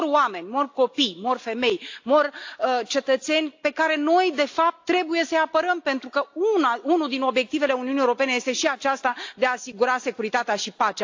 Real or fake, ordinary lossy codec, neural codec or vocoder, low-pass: real; AAC, 48 kbps; none; 7.2 kHz